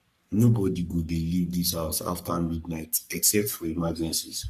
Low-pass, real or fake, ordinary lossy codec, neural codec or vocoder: 14.4 kHz; fake; Opus, 64 kbps; codec, 44.1 kHz, 3.4 kbps, Pupu-Codec